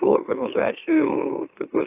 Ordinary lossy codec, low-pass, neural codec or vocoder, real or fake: AAC, 24 kbps; 3.6 kHz; autoencoder, 44.1 kHz, a latent of 192 numbers a frame, MeloTTS; fake